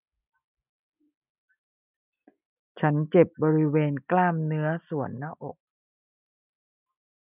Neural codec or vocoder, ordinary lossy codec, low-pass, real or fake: none; none; 3.6 kHz; real